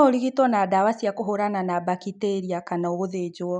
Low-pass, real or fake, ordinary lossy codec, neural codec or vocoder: 9.9 kHz; real; none; none